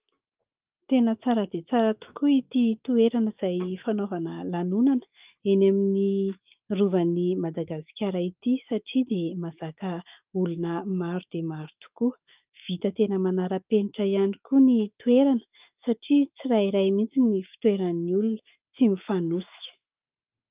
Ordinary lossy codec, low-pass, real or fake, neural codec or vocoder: Opus, 24 kbps; 3.6 kHz; fake; autoencoder, 48 kHz, 128 numbers a frame, DAC-VAE, trained on Japanese speech